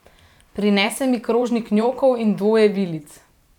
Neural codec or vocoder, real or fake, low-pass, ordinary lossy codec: vocoder, 44.1 kHz, 128 mel bands every 512 samples, BigVGAN v2; fake; 19.8 kHz; none